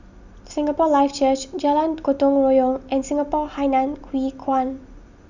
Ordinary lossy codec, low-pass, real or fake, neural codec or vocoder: none; 7.2 kHz; real; none